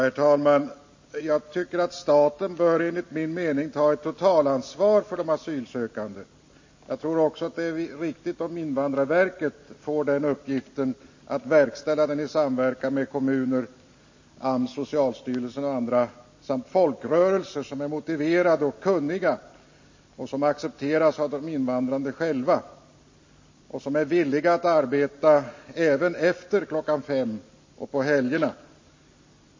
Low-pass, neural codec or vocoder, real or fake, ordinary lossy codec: 7.2 kHz; none; real; MP3, 32 kbps